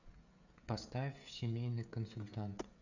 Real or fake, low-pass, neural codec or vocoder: fake; 7.2 kHz; codec, 16 kHz, 16 kbps, FreqCodec, smaller model